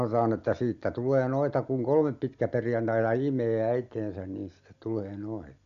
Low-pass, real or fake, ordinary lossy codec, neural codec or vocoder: 7.2 kHz; real; Opus, 64 kbps; none